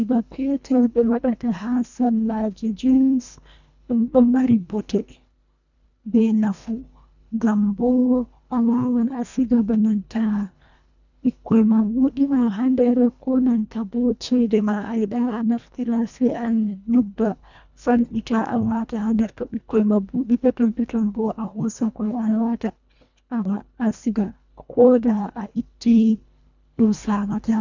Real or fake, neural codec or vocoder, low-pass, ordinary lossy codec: fake; codec, 24 kHz, 1.5 kbps, HILCodec; 7.2 kHz; none